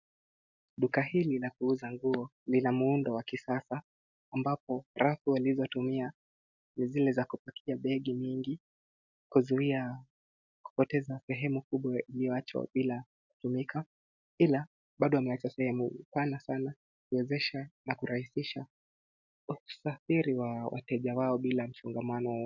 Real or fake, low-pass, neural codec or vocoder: real; 7.2 kHz; none